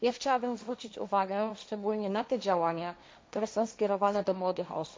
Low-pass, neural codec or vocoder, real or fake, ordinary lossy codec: none; codec, 16 kHz, 1.1 kbps, Voila-Tokenizer; fake; none